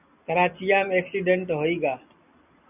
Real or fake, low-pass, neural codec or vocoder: real; 3.6 kHz; none